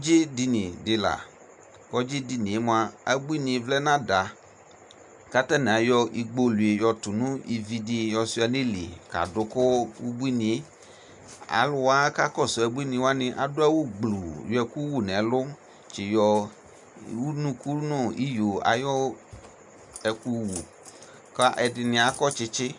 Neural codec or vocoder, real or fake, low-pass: none; real; 10.8 kHz